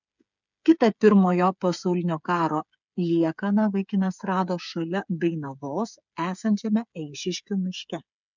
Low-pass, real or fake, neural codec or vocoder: 7.2 kHz; fake; codec, 16 kHz, 8 kbps, FreqCodec, smaller model